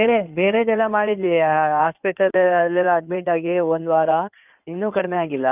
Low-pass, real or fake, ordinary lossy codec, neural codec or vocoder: 3.6 kHz; fake; none; codec, 16 kHz in and 24 kHz out, 2.2 kbps, FireRedTTS-2 codec